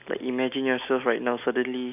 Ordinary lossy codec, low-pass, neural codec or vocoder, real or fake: none; 3.6 kHz; none; real